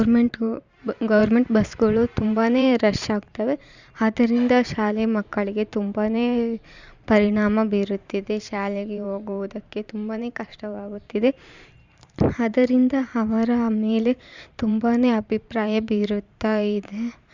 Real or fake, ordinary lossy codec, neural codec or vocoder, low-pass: fake; Opus, 64 kbps; vocoder, 44.1 kHz, 128 mel bands every 512 samples, BigVGAN v2; 7.2 kHz